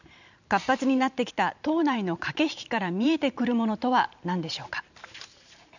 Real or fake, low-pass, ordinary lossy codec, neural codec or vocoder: fake; 7.2 kHz; none; vocoder, 44.1 kHz, 128 mel bands every 512 samples, BigVGAN v2